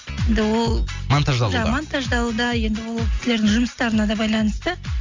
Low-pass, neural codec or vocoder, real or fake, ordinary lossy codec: 7.2 kHz; none; real; MP3, 64 kbps